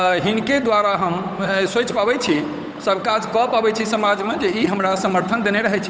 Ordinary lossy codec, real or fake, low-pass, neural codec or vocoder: none; fake; none; codec, 16 kHz, 8 kbps, FunCodec, trained on Chinese and English, 25 frames a second